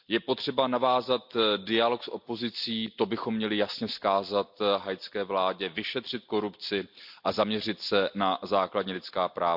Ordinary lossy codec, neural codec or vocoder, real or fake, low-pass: none; none; real; 5.4 kHz